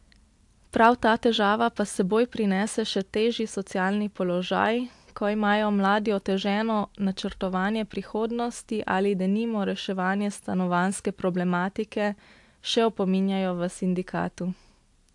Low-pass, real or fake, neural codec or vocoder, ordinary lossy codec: 10.8 kHz; real; none; AAC, 64 kbps